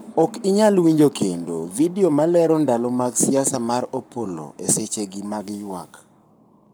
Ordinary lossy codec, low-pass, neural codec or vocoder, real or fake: none; none; codec, 44.1 kHz, 7.8 kbps, Pupu-Codec; fake